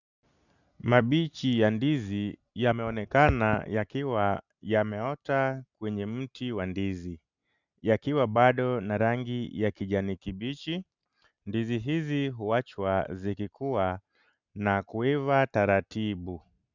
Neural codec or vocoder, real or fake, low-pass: none; real; 7.2 kHz